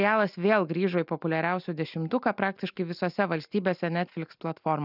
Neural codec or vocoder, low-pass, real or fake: none; 5.4 kHz; real